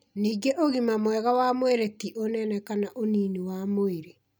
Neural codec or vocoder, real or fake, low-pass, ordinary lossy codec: none; real; none; none